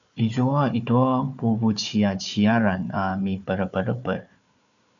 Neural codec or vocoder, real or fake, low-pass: codec, 16 kHz, 4 kbps, FunCodec, trained on Chinese and English, 50 frames a second; fake; 7.2 kHz